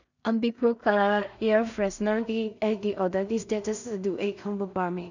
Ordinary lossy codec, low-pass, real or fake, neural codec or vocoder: none; 7.2 kHz; fake; codec, 16 kHz in and 24 kHz out, 0.4 kbps, LongCat-Audio-Codec, two codebook decoder